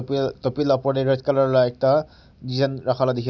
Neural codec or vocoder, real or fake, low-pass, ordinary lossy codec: none; real; 7.2 kHz; none